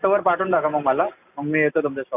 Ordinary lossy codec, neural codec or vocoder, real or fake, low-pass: none; none; real; 3.6 kHz